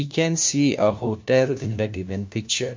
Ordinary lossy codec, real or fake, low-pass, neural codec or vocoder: MP3, 48 kbps; fake; 7.2 kHz; codec, 16 kHz, 1 kbps, FunCodec, trained on LibriTTS, 50 frames a second